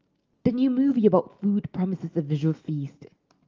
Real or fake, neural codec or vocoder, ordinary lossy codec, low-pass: real; none; Opus, 24 kbps; 7.2 kHz